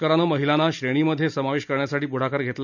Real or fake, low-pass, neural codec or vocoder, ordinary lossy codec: real; 7.2 kHz; none; none